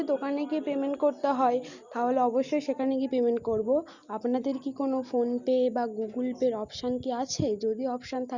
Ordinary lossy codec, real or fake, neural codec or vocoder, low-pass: Opus, 64 kbps; real; none; 7.2 kHz